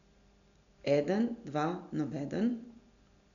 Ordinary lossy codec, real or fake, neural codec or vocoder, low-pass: none; real; none; 7.2 kHz